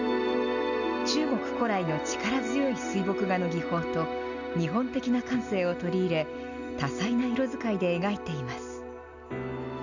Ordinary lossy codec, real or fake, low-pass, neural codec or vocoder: none; real; 7.2 kHz; none